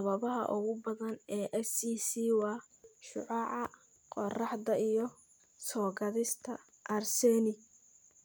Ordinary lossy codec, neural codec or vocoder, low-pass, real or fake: none; none; none; real